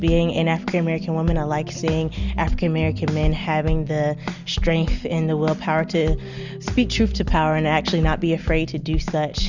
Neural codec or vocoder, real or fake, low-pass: none; real; 7.2 kHz